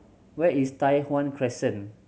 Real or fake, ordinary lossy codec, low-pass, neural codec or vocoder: real; none; none; none